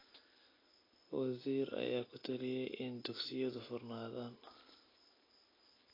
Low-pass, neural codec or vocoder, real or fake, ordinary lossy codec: 5.4 kHz; none; real; AAC, 24 kbps